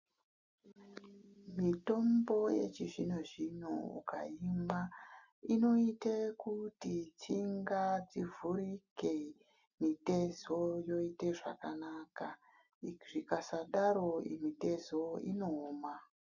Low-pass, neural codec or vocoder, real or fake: 7.2 kHz; none; real